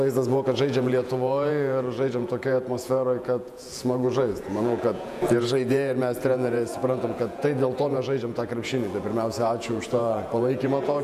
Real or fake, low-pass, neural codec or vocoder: fake; 14.4 kHz; vocoder, 48 kHz, 128 mel bands, Vocos